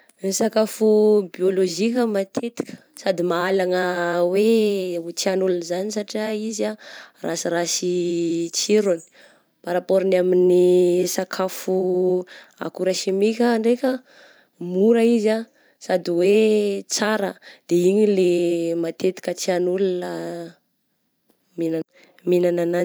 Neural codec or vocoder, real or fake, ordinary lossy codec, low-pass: vocoder, 44.1 kHz, 128 mel bands every 512 samples, BigVGAN v2; fake; none; none